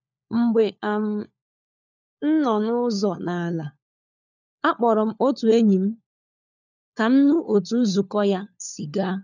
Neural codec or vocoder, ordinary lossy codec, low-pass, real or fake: codec, 16 kHz, 4 kbps, FunCodec, trained on LibriTTS, 50 frames a second; none; 7.2 kHz; fake